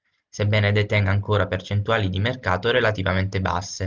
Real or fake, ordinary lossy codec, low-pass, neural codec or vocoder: real; Opus, 24 kbps; 7.2 kHz; none